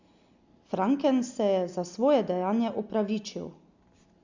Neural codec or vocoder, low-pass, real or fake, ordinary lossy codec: none; 7.2 kHz; real; Opus, 64 kbps